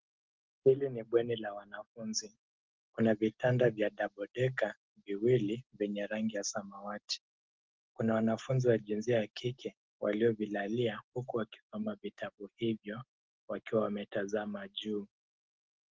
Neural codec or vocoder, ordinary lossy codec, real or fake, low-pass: none; Opus, 16 kbps; real; 7.2 kHz